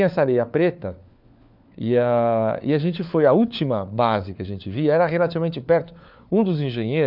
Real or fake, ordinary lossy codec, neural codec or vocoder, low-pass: fake; none; codec, 16 kHz, 4 kbps, FunCodec, trained on LibriTTS, 50 frames a second; 5.4 kHz